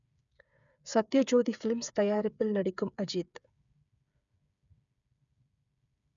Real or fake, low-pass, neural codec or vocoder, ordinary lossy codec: fake; 7.2 kHz; codec, 16 kHz, 8 kbps, FreqCodec, smaller model; none